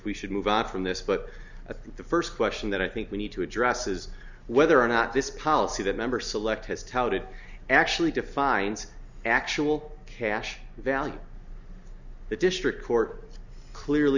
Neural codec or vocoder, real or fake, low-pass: none; real; 7.2 kHz